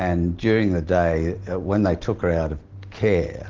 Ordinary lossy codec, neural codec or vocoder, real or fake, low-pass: Opus, 16 kbps; none; real; 7.2 kHz